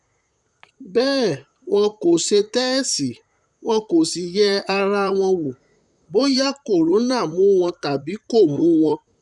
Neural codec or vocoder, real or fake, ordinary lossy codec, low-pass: vocoder, 44.1 kHz, 128 mel bands, Pupu-Vocoder; fake; none; 10.8 kHz